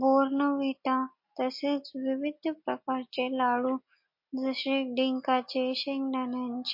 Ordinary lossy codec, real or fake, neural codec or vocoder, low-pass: MP3, 32 kbps; real; none; 5.4 kHz